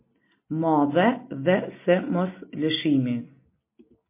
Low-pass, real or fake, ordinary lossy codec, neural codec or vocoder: 3.6 kHz; real; MP3, 24 kbps; none